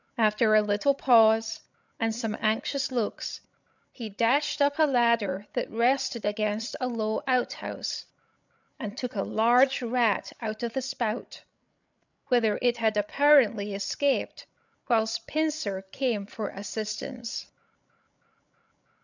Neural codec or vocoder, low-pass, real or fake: codec, 16 kHz, 16 kbps, FreqCodec, larger model; 7.2 kHz; fake